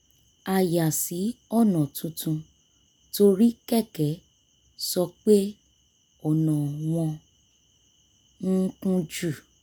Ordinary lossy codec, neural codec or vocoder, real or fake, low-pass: none; none; real; none